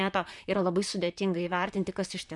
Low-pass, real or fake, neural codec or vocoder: 10.8 kHz; fake; vocoder, 44.1 kHz, 128 mel bands, Pupu-Vocoder